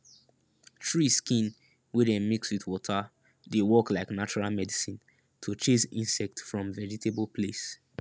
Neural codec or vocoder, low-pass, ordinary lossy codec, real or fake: none; none; none; real